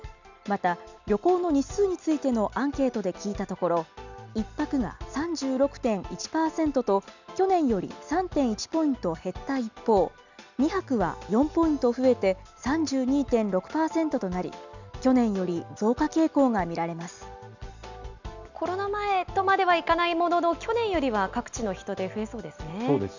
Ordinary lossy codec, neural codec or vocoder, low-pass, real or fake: none; none; 7.2 kHz; real